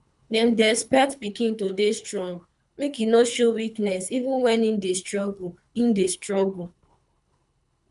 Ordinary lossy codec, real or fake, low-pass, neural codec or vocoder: none; fake; 10.8 kHz; codec, 24 kHz, 3 kbps, HILCodec